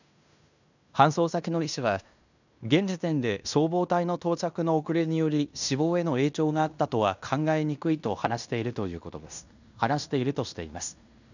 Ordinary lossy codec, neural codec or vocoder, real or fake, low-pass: none; codec, 16 kHz in and 24 kHz out, 0.9 kbps, LongCat-Audio-Codec, fine tuned four codebook decoder; fake; 7.2 kHz